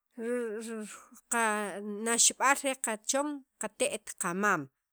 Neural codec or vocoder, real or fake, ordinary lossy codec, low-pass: none; real; none; none